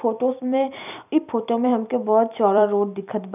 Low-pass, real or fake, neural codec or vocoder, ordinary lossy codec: 3.6 kHz; fake; vocoder, 44.1 kHz, 128 mel bands every 256 samples, BigVGAN v2; none